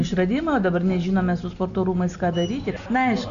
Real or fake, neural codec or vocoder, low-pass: real; none; 7.2 kHz